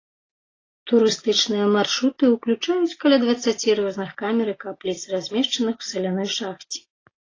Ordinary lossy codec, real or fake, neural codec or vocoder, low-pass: AAC, 32 kbps; real; none; 7.2 kHz